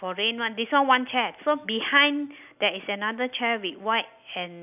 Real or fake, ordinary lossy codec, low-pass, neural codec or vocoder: real; none; 3.6 kHz; none